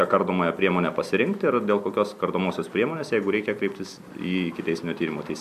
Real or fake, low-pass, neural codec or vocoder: real; 14.4 kHz; none